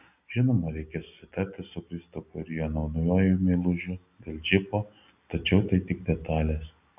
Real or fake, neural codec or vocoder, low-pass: real; none; 3.6 kHz